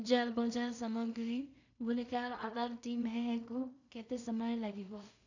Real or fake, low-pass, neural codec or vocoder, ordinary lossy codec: fake; 7.2 kHz; codec, 16 kHz in and 24 kHz out, 0.4 kbps, LongCat-Audio-Codec, two codebook decoder; none